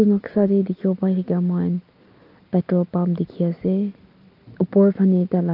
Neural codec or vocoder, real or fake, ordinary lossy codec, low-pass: none; real; Opus, 24 kbps; 5.4 kHz